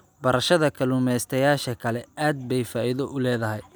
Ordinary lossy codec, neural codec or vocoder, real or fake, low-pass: none; none; real; none